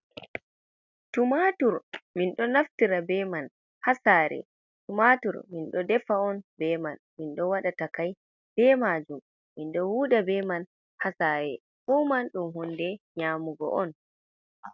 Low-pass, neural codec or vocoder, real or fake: 7.2 kHz; none; real